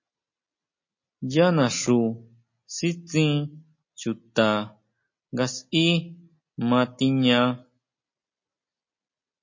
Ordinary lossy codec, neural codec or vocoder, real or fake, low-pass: MP3, 32 kbps; none; real; 7.2 kHz